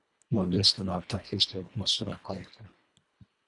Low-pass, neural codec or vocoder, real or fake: 10.8 kHz; codec, 24 kHz, 1.5 kbps, HILCodec; fake